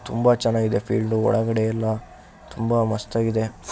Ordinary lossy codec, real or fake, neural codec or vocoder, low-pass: none; real; none; none